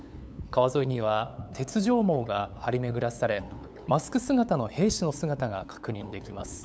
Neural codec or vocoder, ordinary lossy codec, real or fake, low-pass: codec, 16 kHz, 8 kbps, FunCodec, trained on LibriTTS, 25 frames a second; none; fake; none